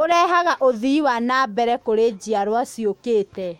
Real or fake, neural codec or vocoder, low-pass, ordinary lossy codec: fake; autoencoder, 48 kHz, 128 numbers a frame, DAC-VAE, trained on Japanese speech; 19.8 kHz; MP3, 64 kbps